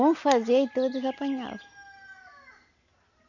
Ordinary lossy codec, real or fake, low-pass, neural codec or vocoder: none; real; 7.2 kHz; none